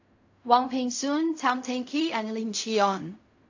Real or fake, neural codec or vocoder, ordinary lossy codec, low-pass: fake; codec, 16 kHz in and 24 kHz out, 0.4 kbps, LongCat-Audio-Codec, fine tuned four codebook decoder; AAC, 48 kbps; 7.2 kHz